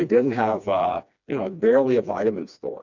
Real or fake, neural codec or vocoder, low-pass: fake; codec, 16 kHz, 2 kbps, FreqCodec, smaller model; 7.2 kHz